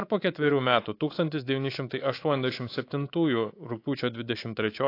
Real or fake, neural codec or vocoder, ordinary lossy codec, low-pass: fake; codec, 24 kHz, 3.1 kbps, DualCodec; AAC, 32 kbps; 5.4 kHz